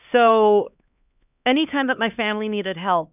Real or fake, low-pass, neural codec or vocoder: fake; 3.6 kHz; codec, 16 kHz, 2 kbps, X-Codec, HuBERT features, trained on LibriSpeech